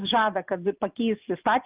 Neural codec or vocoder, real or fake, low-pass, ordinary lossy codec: none; real; 3.6 kHz; Opus, 24 kbps